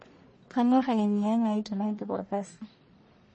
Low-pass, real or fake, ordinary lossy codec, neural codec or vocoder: 9.9 kHz; fake; MP3, 32 kbps; codec, 44.1 kHz, 1.7 kbps, Pupu-Codec